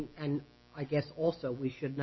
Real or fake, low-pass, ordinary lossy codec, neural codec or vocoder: real; 7.2 kHz; MP3, 24 kbps; none